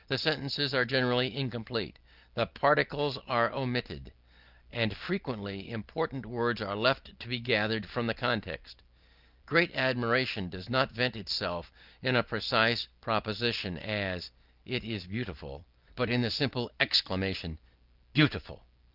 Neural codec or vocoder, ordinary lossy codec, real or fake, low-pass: none; Opus, 24 kbps; real; 5.4 kHz